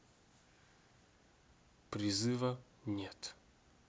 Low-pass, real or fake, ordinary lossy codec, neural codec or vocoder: none; real; none; none